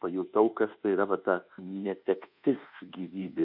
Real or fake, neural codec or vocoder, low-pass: fake; codec, 24 kHz, 1.2 kbps, DualCodec; 5.4 kHz